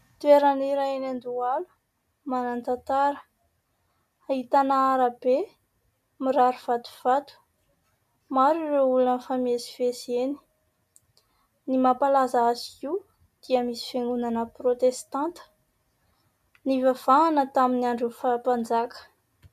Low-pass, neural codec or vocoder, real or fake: 14.4 kHz; none; real